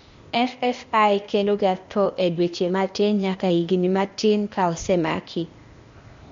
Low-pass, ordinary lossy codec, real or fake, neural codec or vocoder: 7.2 kHz; MP3, 48 kbps; fake; codec, 16 kHz, 0.8 kbps, ZipCodec